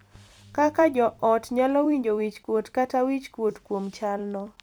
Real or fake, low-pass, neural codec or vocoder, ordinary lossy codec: fake; none; vocoder, 44.1 kHz, 128 mel bands every 256 samples, BigVGAN v2; none